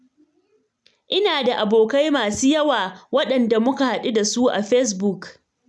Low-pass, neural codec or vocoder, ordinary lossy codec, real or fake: none; none; none; real